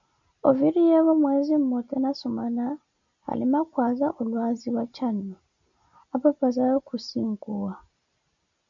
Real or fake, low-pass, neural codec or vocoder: real; 7.2 kHz; none